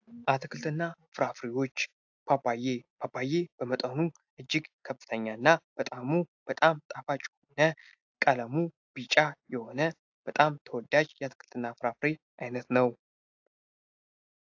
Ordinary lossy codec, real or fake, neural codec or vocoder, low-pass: AAC, 48 kbps; real; none; 7.2 kHz